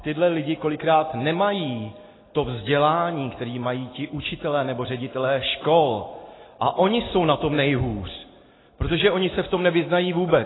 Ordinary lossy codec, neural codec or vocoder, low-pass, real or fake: AAC, 16 kbps; none; 7.2 kHz; real